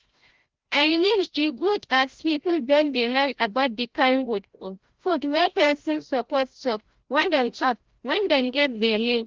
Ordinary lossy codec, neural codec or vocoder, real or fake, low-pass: Opus, 16 kbps; codec, 16 kHz, 0.5 kbps, FreqCodec, larger model; fake; 7.2 kHz